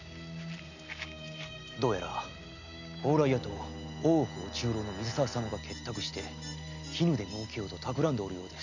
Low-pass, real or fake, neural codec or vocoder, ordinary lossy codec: 7.2 kHz; real; none; none